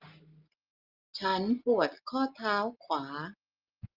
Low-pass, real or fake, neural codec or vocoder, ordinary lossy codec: 5.4 kHz; real; none; Opus, 32 kbps